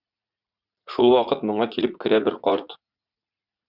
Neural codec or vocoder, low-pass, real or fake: vocoder, 44.1 kHz, 80 mel bands, Vocos; 5.4 kHz; fake